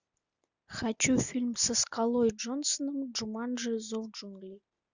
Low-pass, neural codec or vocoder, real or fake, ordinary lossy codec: 7.2 kHz; none; real; Opus, 64 kbps